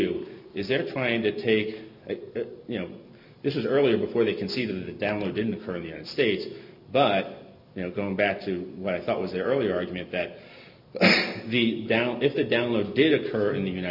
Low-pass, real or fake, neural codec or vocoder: 5.4 kHz; real; none